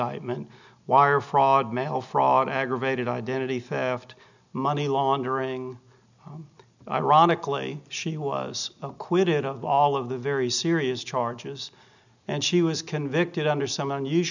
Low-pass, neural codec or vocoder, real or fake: 7.2 kHz; none; real